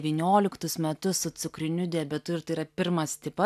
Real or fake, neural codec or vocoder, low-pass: real; none; 14.4 kHz